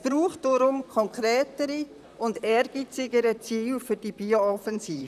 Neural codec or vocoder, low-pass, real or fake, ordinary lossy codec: vocoder, 44.1 kHz, 128 mel bands, Pupu-Vocoder; 14.4 kHz; fake; none